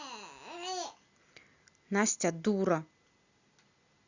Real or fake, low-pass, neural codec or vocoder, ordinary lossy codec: real; 7.2 kHz; none; Opus, 64 kbps